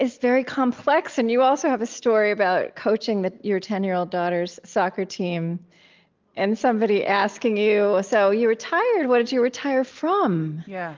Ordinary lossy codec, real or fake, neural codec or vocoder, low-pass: Opus, 32 kbps; real; none; 7.2 kHz